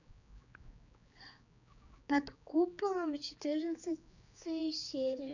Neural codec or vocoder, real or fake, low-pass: codec, 16 kHz, 4 kbps, X-Codec, HuBERT features, trained on general audio; fake; 7.2 kHz